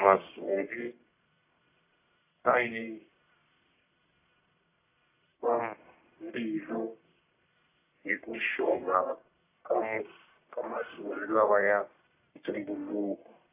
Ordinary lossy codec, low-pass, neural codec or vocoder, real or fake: AAC, 24 kbps; 3.6 kHz; codec, 44.1 kHz, 1.7 kbps, Pupu-Codec; fake